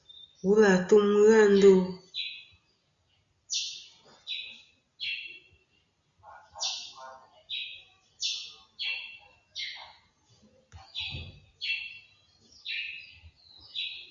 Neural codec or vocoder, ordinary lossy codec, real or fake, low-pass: none; Opus, 64 kbps; real; 7.2 kHz